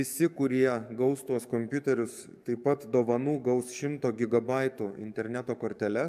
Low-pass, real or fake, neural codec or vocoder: 14.4 kHz; fake; codec, 44.1 kHz, 7.8 kbps, DAC